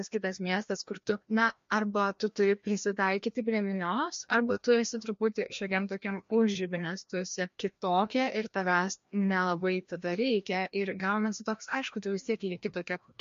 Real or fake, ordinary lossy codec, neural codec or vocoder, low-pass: fake; MP3, 48 kbps; codec, 16 kHz, 1 kbps, FreqCodec, larger model; 7.2 kHz